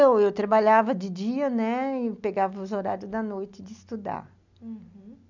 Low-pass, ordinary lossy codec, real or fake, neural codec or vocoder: 7.2 kHz; none; real; none